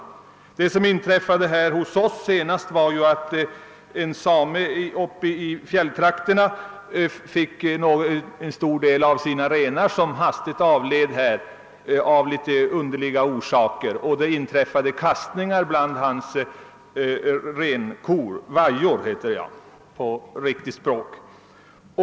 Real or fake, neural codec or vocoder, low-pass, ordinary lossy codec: real; none; none; none